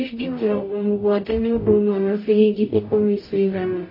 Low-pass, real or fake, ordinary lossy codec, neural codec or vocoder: 5.4 kHz; fake; MP3, 24 kbps; codec, 44.1 kHz, 0.9 kbps, DAC